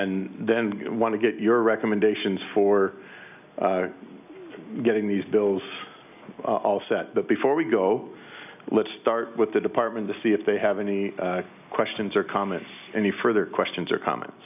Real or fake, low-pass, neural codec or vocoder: real; 3.6 kHz; none